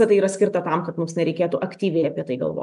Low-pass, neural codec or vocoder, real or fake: 10.8 kHz; none; real